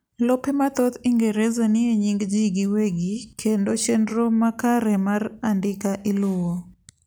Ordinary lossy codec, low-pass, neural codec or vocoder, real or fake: none; none; none; real